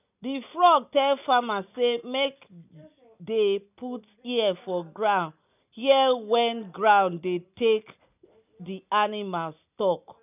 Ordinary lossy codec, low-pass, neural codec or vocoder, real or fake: none; 3.6 kHz; none; real